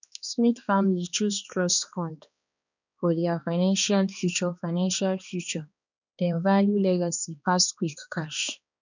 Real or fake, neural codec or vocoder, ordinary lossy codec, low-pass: fake; codec, 16 kHz, 2 kbps, X-Codec, HuBERT features, trained on balanced general audio; none; 7.2 kHz